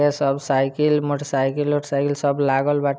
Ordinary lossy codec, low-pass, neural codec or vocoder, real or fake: none; none; none; real